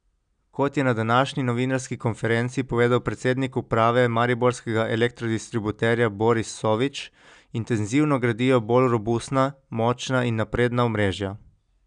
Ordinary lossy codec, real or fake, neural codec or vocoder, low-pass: none; real; none; 9.9 kHz